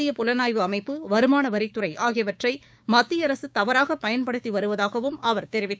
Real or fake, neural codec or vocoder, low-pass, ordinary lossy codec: fake; codec, 16 kHz, 6 kbps, DAC; none; none